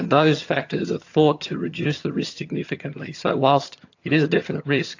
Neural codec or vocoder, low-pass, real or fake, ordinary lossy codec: vocoder, 22.05 kHz, 80 mel bands, HiFi-GAN; 7.2 kHz; fake; AAC, 48 kbps